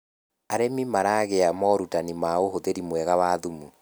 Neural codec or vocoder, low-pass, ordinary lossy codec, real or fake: none; none; none; real